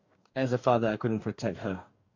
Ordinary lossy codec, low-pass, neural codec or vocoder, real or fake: MP3, 48 kbps; 7.2 kHz; codec, 44.1 kHz, 2.6 kbps, DAC; fake